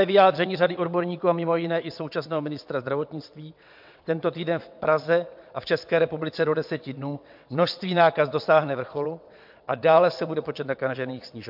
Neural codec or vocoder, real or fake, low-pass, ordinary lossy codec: vocoder, 22.05 kHz, 80 mel bands, WaveNeXt; fake; 5.4 kHz; MP3, 48 kbps